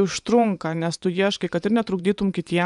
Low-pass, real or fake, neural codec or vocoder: 10.8 kHz; fake; vocoder, 24 kHz, 100 mel bands, Vocos